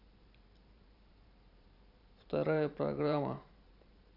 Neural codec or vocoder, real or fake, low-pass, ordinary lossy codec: vocoder, 44.1 kHz, 128 mel bands every 512 samples, BigVGAN v2; fake; 5.4 kHz; none